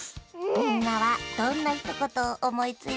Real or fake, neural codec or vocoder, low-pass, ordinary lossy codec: real; none; none; none